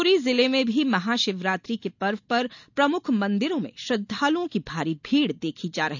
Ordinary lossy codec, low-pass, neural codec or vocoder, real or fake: none; 7.2 kHz; none; real